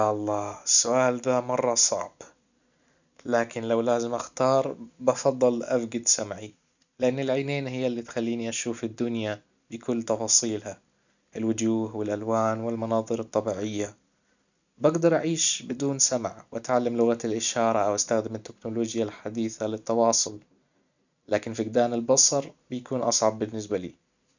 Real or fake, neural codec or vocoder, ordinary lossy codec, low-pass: real; none; none; 7.2 kHz